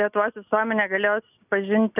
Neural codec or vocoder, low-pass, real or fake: none; 3.6 kHz; real